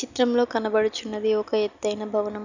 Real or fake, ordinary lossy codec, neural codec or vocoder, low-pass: real; none; none; 7.2 kHz